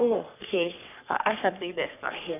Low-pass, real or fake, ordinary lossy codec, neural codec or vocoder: 3.6 kHz; fake; none; codec, 44.1 kHz, 3.4 kbps, Pupu-Codec